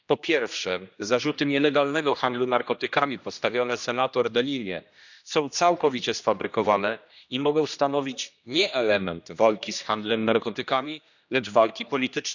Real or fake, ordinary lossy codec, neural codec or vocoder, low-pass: fake; none; codec, 16 kHz, 1 kbps, X-Codec, HuBERT features, trained on general audio; 7.2 kHz